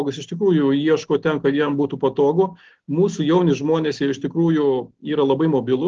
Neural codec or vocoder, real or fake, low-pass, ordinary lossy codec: none; real; 7.2 kHz; Opus, 16 kbps